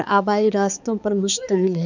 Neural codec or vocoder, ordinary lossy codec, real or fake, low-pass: codec, 16 kHz, 4 kbps, X-Codec, HuBERT features, trained on balanced general audio; none; fake; 7.2 kHz